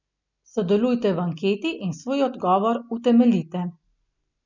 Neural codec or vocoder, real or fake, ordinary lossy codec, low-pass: none; real; none; 7.2 kHz